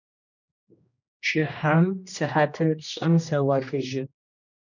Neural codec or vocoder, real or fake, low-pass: codec, 16 kHz, 1 kbps, X-Codec, HuBERT features, trained on general audio; fake; 7.2 kHz